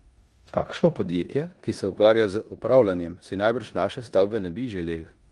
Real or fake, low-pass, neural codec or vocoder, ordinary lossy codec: fake; 10.8 kHz; codec, 16 kHz in and 24 kHz out, 0.9 kbps, LongCat-Audio-Codec, four codebook decoder; Opus, 32 kbps